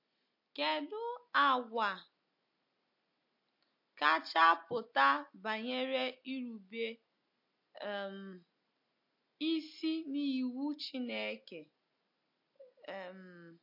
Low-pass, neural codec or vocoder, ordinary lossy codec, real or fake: 5.4 kHz; none; MP3, 32 kbps; real